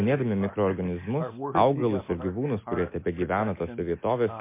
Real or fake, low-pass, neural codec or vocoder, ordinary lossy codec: real; 3.6 kHz; none; MP3, 24 kbps